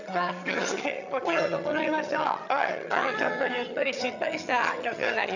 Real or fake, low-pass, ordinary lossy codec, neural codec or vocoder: fake; 7.2 kHz; none; vocoder, 22.05 kHz, 80 mel bands, HiFi-GAN